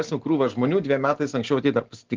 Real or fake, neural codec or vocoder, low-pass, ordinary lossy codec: real; none; 7.2 kHz; Opus, 16 kbps